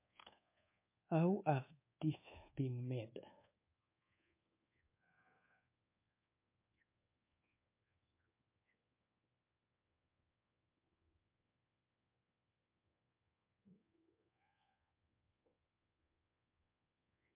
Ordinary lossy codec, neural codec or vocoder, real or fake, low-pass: MP3, 24 kbps; codec, 24 kHz, 1.2 kbps, DualCodec; fake; 3.6 kHz